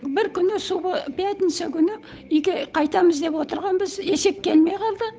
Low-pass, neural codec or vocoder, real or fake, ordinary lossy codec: none; codec, 16 kHz, 8 kbps, FunCodec, trained on Chinese and English, 25 frames a second; fake; none